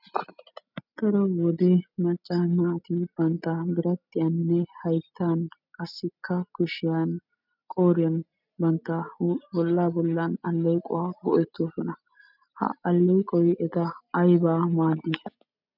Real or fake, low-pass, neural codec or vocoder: real; 5.4 kHz; none